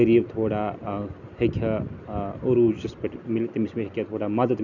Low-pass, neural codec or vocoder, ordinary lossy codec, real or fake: 7.2 kHz; none; none; real